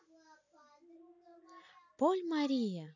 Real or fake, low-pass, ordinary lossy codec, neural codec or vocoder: real; 7.2 kHz; none; none